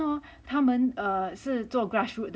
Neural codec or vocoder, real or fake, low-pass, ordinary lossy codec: none; real; none; none